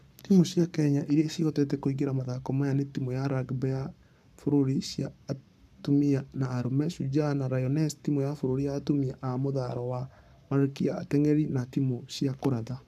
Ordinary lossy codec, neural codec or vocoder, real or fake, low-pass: none; codec, 44.1 kHz, 7.8 kbps, Pupu-Codec; fake; 14.4 kHz